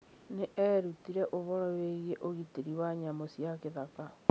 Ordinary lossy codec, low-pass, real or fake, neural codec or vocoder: none; none; real; none